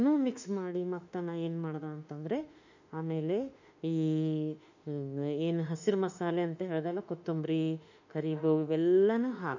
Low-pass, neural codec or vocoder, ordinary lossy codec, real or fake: 7.2 kHz; autoencoder, 48 kHz, 32 numbers a frame, DAC-VAE, trained on Japanese speech; none; fake